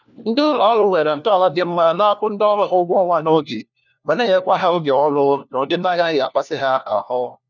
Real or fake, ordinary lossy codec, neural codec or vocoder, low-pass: fake; none; codec, 16 kHz, 1 kbps, FunCodec, trained on LibriTTS, 50 frames a second; 7.2 kHz